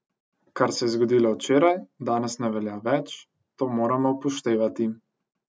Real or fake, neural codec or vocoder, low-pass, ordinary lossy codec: real; none; none; none